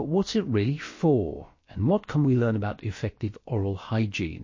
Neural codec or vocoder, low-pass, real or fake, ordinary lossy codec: codec, 16 kHz, about 1 kbps, DyCAST, with the encoder's durations; 7.2 kHz; fake; MP3, 32 kbps